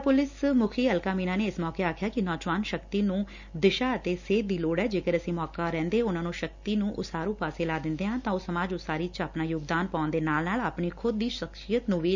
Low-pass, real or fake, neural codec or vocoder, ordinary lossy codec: 7.2 kHz; real; none; none